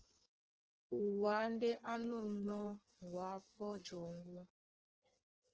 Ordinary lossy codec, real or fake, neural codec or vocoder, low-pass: Opus, 16 kbps; fake; codec, 16 kHz in and 24 kHz out, 1.1 kbps, FireRedTTS-2 codec; 7.2 kHz